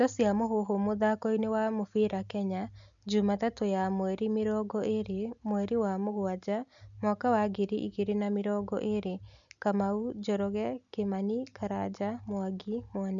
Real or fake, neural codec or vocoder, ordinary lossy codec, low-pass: real; none; none; 7.2 kHz